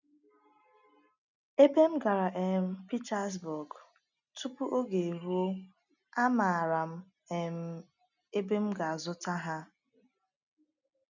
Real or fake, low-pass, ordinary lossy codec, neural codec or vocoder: real; 7.2 kHz; none; none